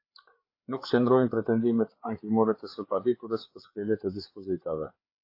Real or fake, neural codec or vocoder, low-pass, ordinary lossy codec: fake; codec, 16 kHz, 4 kbps, FreqCodec, larger model; 5.4 kHz; AAC, 32 kbps